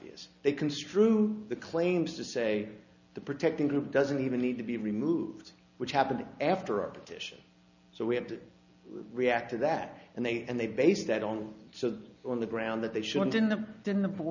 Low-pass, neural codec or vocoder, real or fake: 7.2 kHz; none; real